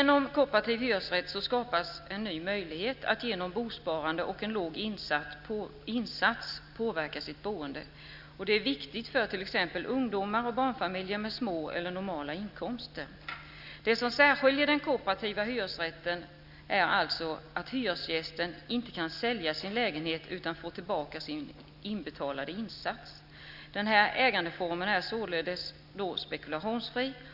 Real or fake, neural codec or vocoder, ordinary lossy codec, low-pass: real; none; none; 5.4 kHz